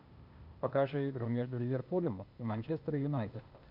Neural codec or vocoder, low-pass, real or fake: codec, 16 kHz, 0.8 kbps, ZipCodec; 5.4 kHz; fake